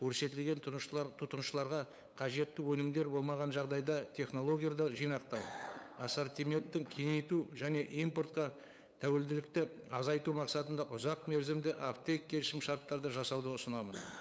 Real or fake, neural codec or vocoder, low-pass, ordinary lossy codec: fake; codec, 16 kHz, 8 kbps, FunCodec, trained on LibriTTS, 25 frames a second; none; none